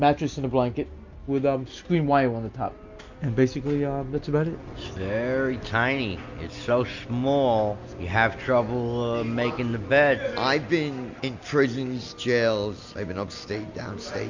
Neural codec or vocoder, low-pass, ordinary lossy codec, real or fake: none; 7.2 kHz; MP3, 64 kbps; real